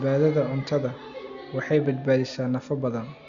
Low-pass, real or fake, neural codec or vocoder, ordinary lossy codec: 7.2 kHz; real; none; Opus, 64 kbps